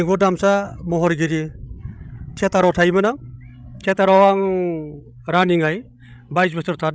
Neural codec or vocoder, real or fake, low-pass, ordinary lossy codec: codec, 16 kHz, 16 kbps, FreqCodec, larger model; fake; none; none